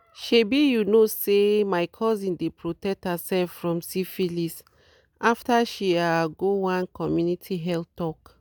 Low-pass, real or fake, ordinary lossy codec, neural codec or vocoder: none; real; none; none